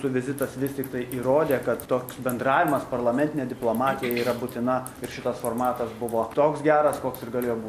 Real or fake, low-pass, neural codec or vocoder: real; 14.4 kHz; none